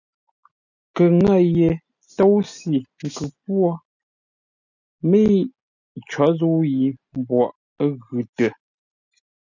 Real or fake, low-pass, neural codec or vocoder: real; 7.2 kHz; none